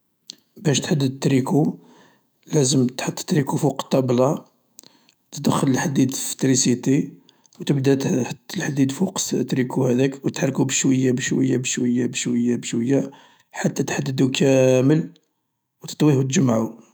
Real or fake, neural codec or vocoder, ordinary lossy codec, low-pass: fake; autoencoder, 48 kHz, 128 numbers a frame, DAC-VAE, trained on Japanese speech; none; none